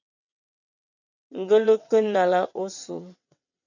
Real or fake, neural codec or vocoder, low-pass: fake; vocoder, 22.05 kHz, 80 mel bands, WaveNeXt; 7.2 kHz